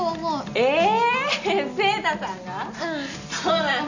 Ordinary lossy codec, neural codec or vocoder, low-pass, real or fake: none; none; 7.2 kHz; real